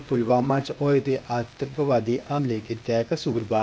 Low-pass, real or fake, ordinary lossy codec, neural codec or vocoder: none; fake; none; codec, 16 kHz, 0.8 kbps, ZipCodec